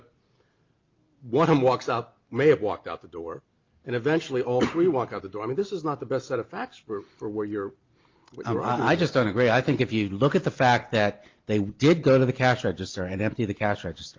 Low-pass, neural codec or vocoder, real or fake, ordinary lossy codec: 7.2 kHz; none; real; Opus, 32 kbps